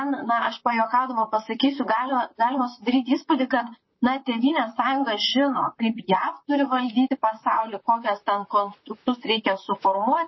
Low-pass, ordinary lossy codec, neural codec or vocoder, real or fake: 7.2 kHz; MP3, 24 kbps; vocoder, 44.1 kHz, 128 mel bands, Pupu-Vocoder; fake